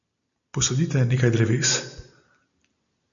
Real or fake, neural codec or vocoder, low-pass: real; none; 7.2 kHz